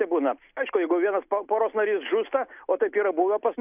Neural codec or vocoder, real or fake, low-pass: none; real; 3.6 kHz